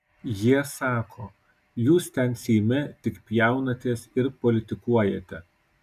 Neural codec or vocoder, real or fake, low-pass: none; real; 14.4 kHz